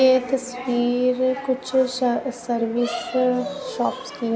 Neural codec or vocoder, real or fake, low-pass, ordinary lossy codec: none; real; none; none